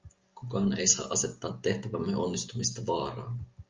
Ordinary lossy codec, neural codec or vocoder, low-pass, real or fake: Opus, 32 kbps; none; 7.2 kHz; real